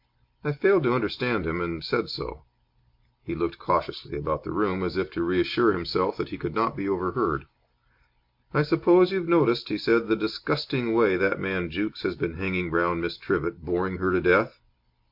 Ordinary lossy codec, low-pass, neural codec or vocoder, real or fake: MP3, 48 kbps; 5.4 kHz; none; real